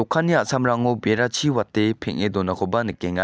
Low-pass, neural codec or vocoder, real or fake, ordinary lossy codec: none; none; real; none